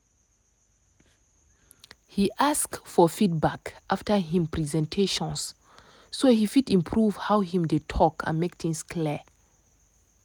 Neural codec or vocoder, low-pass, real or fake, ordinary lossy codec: none; none; real; none